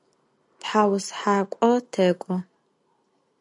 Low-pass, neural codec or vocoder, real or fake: 10.8 kHz; none; real